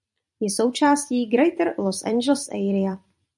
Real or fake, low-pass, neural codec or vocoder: fake; 10.8 kHz; vocoder, 44.1 kHz, 128 mel bands every 256 samples, BigVGAN v2